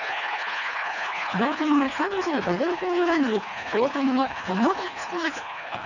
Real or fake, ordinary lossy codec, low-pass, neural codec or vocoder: fake; none; 7.2 kHz; codec, 24 kHz, 1.5 kbps, HILCodec